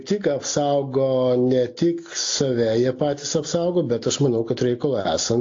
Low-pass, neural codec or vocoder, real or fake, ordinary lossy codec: 7.2 kHz; none; real; AAC, 48 kbps